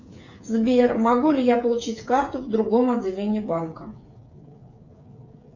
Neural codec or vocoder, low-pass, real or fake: codec, 16 kHz, 8 kbps, FreqCodec, smaller model; 7.2 kHz; fake